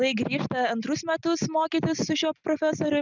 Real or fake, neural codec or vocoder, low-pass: real; none; 7.2 kHz